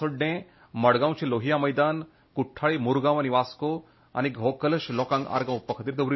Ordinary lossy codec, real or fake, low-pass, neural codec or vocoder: MP3, 24 kbps; real; 7.2 kHz; none